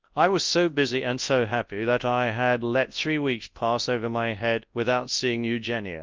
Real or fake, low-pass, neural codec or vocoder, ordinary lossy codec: fake; 7.2 kHz; codec, 24 kHz, 0.9 kbps, WavTokenizer, large speech release; Opus, 32 kbps